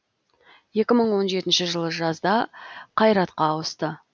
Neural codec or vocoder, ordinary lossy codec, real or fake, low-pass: none; none; real; none